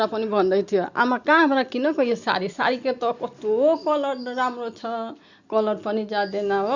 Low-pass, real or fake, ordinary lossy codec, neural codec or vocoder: 7.2 kHz; real; Opus, 64 kbps; none